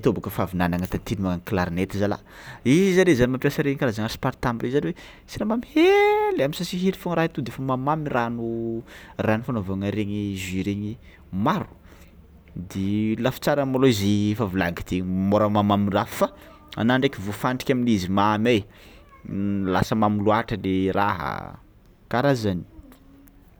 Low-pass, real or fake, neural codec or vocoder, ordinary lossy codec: none; real; none; none